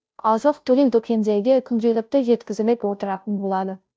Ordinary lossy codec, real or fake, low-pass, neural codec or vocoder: none; fake; none; codec, 16 kHz, 0.5 kbps, FunCodec, trained on Chinese and English, 25 frames a second